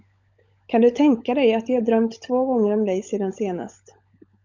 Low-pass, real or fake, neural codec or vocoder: 7.2 kHz; fake; codec, 16 kHz, 16 kbps, FunCodec, trained on LibriTTS, 50 frames a second